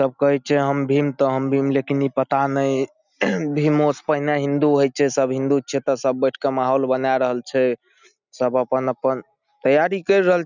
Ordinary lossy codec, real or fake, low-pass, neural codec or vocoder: none; real; 7.2 kHz; none